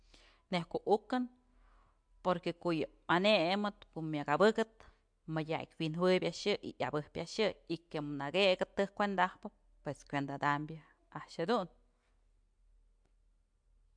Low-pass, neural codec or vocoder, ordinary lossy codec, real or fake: 9.9 kHz; none; MP3, 64 kbps; real